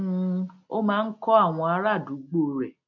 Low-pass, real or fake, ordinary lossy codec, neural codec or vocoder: 7.2 kHz; real; MP3, 48 kbps; none